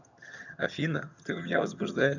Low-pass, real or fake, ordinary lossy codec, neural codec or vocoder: 7.2 kHz; fake; none; vocoder, 22.05 kHz, 80 mel bands, HiFi-GAN